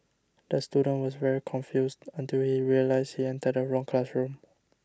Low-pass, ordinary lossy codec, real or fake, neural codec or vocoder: none; none; real; none